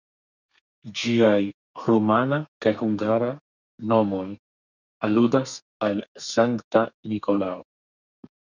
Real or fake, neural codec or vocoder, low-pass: fake; codec, 32 kHz, 1.9 kbps, SNAC; 7.2 kHz